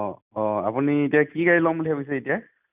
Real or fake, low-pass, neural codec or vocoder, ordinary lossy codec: real; 3.6 kHz; none; none